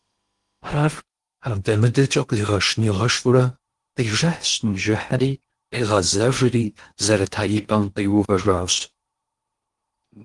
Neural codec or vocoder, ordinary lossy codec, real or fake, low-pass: codec, 16 kHz in and 24 kHz out, 0.8 kbps, FocalCodec, streaming, 65536 codes; Opus, 24 kbps; fake; 10.8 kHz